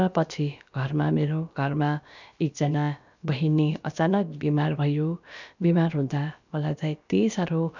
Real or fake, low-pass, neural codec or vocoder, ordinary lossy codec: fake; 7.2 kHz; codec, 16 kHz, about 1 kbps, DyCAST, with the encoder's durations; none